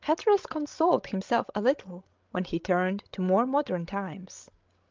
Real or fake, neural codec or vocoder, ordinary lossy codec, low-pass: fake; codec, 16 kHz, 16 kbps, FreqCodec, larger model; Opus, 24 kbps; 7.2 kHz